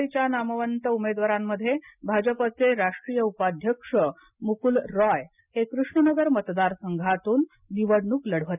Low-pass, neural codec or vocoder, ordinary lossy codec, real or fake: 3.6 kHz; none; none; real